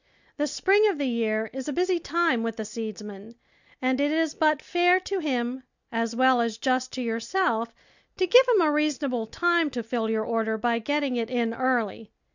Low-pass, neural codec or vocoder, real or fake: 7.2 kHz; none; real